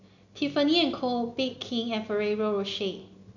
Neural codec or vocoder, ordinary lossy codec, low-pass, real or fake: none; none; 7.2 kHz; real